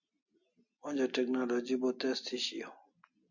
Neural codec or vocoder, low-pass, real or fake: none; 7.2 kHz; real